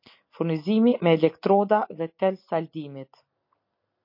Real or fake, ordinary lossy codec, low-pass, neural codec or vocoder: real; MP3, 32 kbps; 5.4 kHz; none